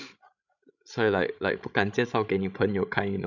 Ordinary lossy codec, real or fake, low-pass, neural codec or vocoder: none; fake; 7.2 kHz; codec, 16 kHz, 16 kbps, FreqCodec, larger model